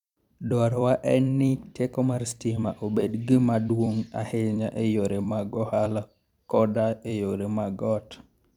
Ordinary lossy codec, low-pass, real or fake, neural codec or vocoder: none; 19.8 kHz; fake; vocoder, 44.1 kHz, 128 mel bands every 256 samples, BigVGAN v2